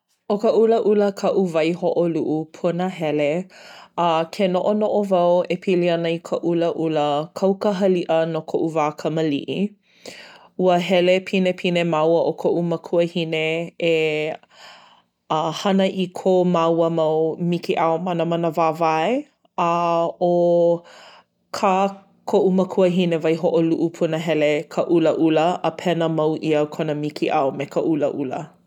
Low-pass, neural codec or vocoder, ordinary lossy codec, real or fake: 19.8 kHz; none; none; real